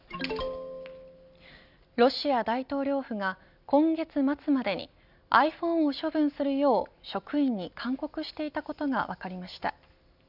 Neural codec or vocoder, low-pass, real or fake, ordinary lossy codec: none; 5.4 kHz; real; none